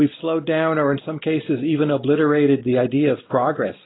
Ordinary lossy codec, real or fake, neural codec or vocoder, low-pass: AAC, 16 kbps; real; none; 7.2 kHz